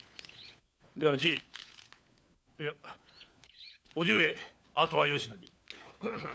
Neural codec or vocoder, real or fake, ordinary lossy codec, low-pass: codec, 16 kHz, 4 kbps, FunCodec, trained on LibriTTS, 50 frames a second; fake; none; none